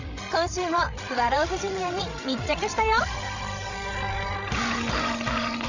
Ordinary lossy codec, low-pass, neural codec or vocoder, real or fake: none; 7.2 kHz; codec, 16 kHz, 16 kbps, FreqCodec, larger model; fake